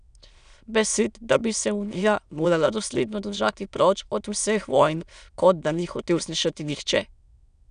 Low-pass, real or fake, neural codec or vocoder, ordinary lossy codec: 9.9 kHz; fake; autoencoder, 22.05 kHz, a latent of 192 numbers a frame, VITS, trained on many speakers; none